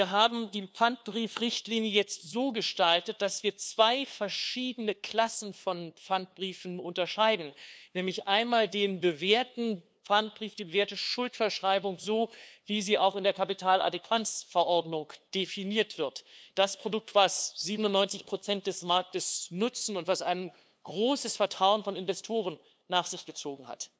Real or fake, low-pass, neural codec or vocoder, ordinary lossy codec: fake; none; codec, 16 kHz, 2 kbps, FunCodec, trained on LibriTTS, 25 frames a second; none